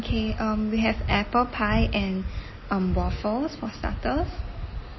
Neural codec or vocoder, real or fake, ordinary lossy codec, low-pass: none; real; MP3, 24 kbps; 7.2 kHz